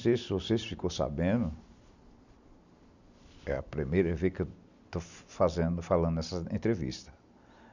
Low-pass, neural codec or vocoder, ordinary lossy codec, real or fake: 7.2 kHz; none; none; real